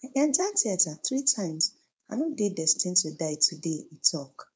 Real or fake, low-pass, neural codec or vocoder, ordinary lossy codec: fake; none; codec, 16 kHz, 4.8 kbps, FACodec; none